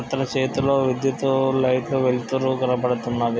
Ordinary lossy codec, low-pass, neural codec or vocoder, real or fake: none; none; none; real